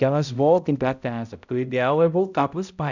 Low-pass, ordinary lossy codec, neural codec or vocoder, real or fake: 7.2 kHz; none; codec, 16 kHz, 0.5 kbps, X-Codec, HuBERT features, trained on balanced general audio; fake